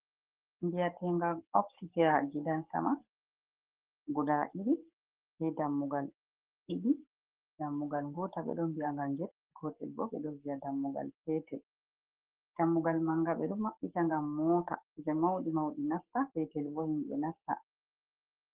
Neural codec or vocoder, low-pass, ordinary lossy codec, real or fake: codec, 44.1 kHz, 7.8 kbps, Pupu-Codec; 3.6 kHz; Opus, 16 kbps; fake